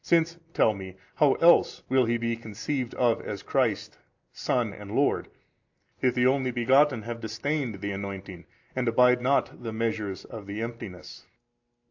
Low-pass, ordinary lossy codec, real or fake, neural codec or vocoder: 7.2 kHz; AAC, 48 kbps; real; none